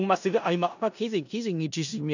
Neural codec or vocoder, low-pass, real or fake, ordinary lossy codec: codec, 16 kHz in and 24 kHz out, 0.4 kbps, LongCat-Audio-Codec, four codebook decoder; 7.2 kHz; fake; none